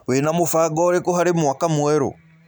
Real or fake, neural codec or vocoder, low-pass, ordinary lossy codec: real; none; none; none